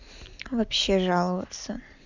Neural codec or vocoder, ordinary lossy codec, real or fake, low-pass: none; none; real; 7.2 kHz